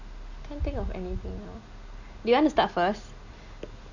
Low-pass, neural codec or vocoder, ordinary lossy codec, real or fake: 7.2 kHz; none; none; real